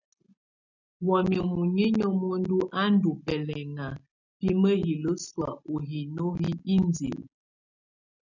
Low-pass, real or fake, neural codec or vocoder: 7.2 kHz; real; none